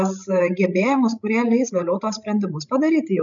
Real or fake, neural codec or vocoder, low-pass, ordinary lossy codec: fake; codec, 16 kHz, 16 kbps, FreqCodec, larger model; 7.2 kHz; MP3, 96 kbps